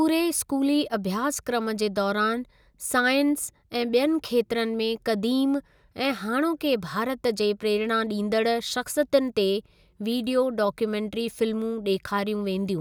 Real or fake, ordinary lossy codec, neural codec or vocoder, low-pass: real; none; none; none